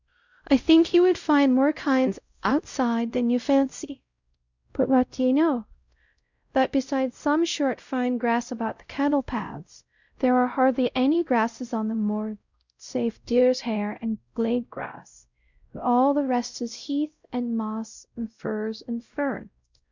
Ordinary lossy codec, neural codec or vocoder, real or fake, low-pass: Opus, 64 kbps; codec, 16 kHz, 0.5 kbps, X-Codec, WavLM features, trained on Multilingual LibriSpeech; fake; 7.2 kHz